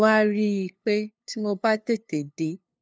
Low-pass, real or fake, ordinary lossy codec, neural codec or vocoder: none; fake; none; codec, 16 kHz, 2 kbps, FunCodec, trained on LibriTTS, 25 frames a second